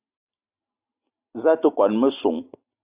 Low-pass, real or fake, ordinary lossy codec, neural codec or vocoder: 3.6 kHz; real; Opus, 64 kbps; none